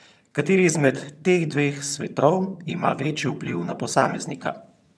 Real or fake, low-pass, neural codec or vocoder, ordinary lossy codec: fake; none; vocoder, 22.05 kHz, 80 mel bands, HiFi-GAN; none